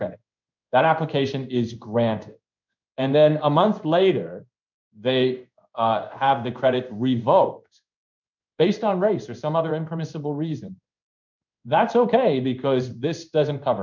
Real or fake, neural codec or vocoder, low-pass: fake; codec, 16 kHz in and 24 kHz out, 1 kbps, XY-Tokenizer; 7.2 kHz